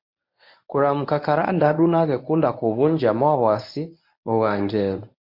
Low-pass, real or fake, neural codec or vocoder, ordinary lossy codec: 5.4 kHz; fake; codec, 24 kHz, 0.9 kbps, WavTokenizer, medium speech release version 1; MP3, 32 kbps